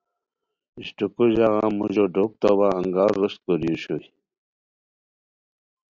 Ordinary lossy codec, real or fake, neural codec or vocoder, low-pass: Opus, 64 kbps; real; none; 7.2 kHz